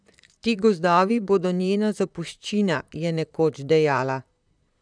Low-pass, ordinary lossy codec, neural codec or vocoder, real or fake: 9.9 kHz; none; vocoder, 44.1 kHz, 128 mel bands, Pupu-Vocoder; fake